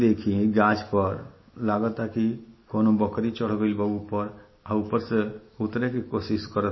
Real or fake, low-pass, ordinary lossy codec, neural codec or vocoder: real; 7.2 kHz; MP3, 24 kbps; none